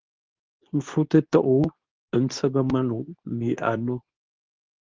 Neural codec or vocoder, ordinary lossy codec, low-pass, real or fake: codec, 24 kHz, 0.9 kbps, WavTokenizer, medium speech release version 2; Opus, 32 kbps; 7.2 kHz; fake